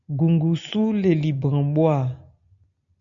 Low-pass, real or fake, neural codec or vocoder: 7.2 kHz; real; none